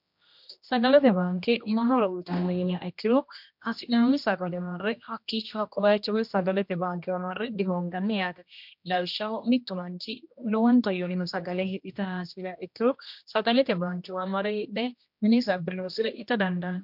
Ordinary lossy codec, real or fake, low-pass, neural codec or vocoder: MP3, 48 kbps; fake; 5.4 kHz; codec, 16 kHz, 1 kbps, X-Codec, HuBERT features, trained on general audio